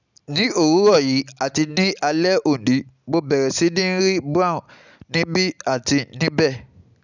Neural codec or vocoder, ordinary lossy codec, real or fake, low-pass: none; none; real; 7.2 kHz